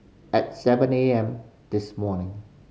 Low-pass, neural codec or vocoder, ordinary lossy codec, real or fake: none; none; none; real